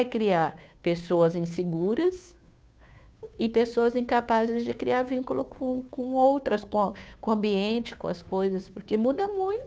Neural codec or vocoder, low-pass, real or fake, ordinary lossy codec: codec, 16 kHz, 2 kbps, FunCodec, trained on Chinese and English, 25 frames a second; none; fake; none